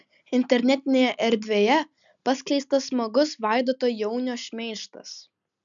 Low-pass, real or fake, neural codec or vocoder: 7.2 kHz; real; none